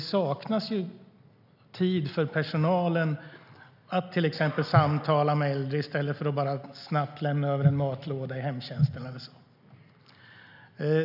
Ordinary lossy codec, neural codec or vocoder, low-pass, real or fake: none; none; 5.4 kHz; real